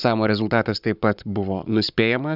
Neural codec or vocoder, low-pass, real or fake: codec, 44.1 kHz, 7.8 kbps, Pupu-Codec; 5.4 kHz; fake